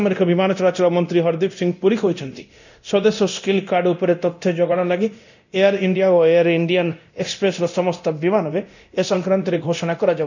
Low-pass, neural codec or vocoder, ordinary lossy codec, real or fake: 7.2 kHz; codec, 24 kHz, 0.9 kbps, DualCodec; none; fake